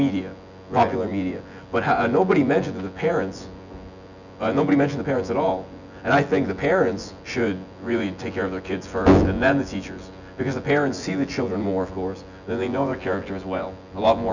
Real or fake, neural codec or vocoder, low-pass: fake; vocoder, 24 kHz, 100 mel bands, Vocos; 7.2 kHz